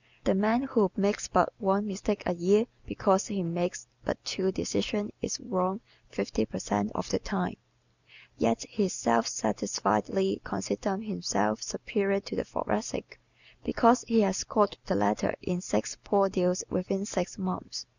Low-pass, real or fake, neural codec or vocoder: 7.2 kHz; real; none